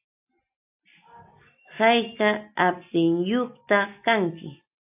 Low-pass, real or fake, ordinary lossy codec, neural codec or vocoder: 3.6 kHz; real; AAC, 32 kbps; none